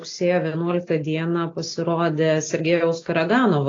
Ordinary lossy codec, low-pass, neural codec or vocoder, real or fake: AAC, 32 kbps; 7.2 kHz; none; real